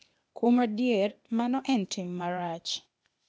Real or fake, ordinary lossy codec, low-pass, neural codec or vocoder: fake; none; none; codec, 16 kHz, 0.8 kbps, ZipCodec